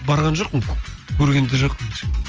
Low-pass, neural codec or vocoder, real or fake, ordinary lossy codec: 7.2 kHz; none; real; Opus, 24 kbps